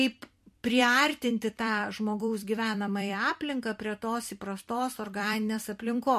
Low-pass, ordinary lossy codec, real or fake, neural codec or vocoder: 14.4 kHz; MP3, 64 kbps; fake; vocoder, 44.1 kHz, 128 mel bands every 256 samples, BigVGAN v2